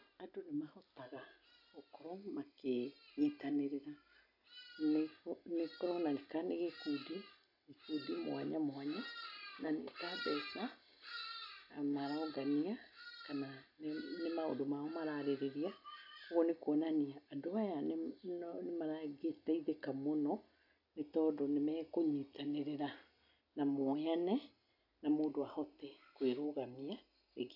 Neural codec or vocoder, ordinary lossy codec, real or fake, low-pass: none; none; real; 5.4 kHz